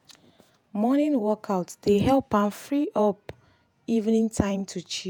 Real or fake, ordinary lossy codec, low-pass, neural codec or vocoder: fake; none; 19.8 kHz; vocoder, 48 kHz, 128 mel bands, Vocos